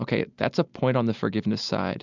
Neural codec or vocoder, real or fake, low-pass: none; real; 7.2 kHz